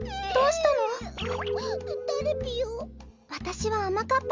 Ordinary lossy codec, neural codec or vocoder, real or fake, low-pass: Opus, 32 kbps; none; real; 7.2 kHz